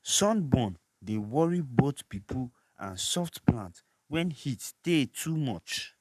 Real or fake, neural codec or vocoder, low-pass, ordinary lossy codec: fake; codec, 44.1 kHz, 7.8 kbps, Pupu-Codec; 14.4 kHz; none